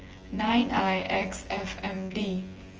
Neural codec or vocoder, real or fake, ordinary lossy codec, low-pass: vocoder, 24 kHz, 100 mel bands, Vocos; fake; Opus, 24 kbps; 7.2 kHz